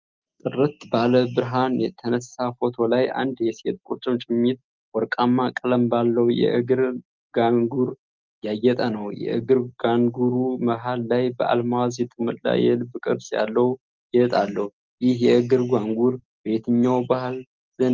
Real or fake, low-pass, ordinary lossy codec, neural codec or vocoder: real; 7.2 kHz; Opus, 32 kbps; none